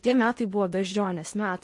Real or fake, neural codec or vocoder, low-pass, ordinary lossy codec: fake; codec, 16 kHz in and 24 kHz out, 0.8 kbps, FocalCodec, streaming, 65536 codes; 10.8 kHz; MP3, 48 kbps